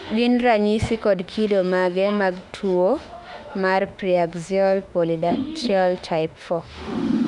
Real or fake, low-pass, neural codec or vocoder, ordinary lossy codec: fake; 10.8 kHz; autoencoder, 48 kHz, 32 numbers a frame, DAC-VAE, trained on Japanese speech; none